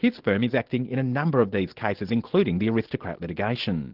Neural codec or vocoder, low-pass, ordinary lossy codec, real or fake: none; 5.4 kHz; Opus, 16 kbps; real